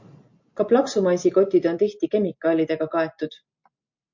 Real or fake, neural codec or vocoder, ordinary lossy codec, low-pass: real; none; MP3, 48 kbps; 7.2 kHz